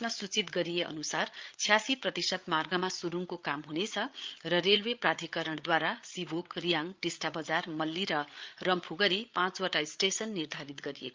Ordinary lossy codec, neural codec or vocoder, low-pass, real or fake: Opus, 24 kbps; codec, 16 kHz, 8 kbps, FreqCodec, larger model; 7.2 kHz; fake